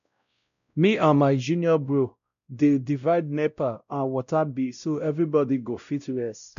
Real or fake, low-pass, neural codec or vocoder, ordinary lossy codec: fake; 7.2 kHz; codec, 16 kHz, 0.5 kbps, X-Codec, WavLM features, trained on Multilingual LibriSpeech; none